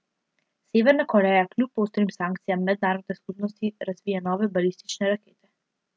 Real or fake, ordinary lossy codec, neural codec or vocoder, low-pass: real; none; none; none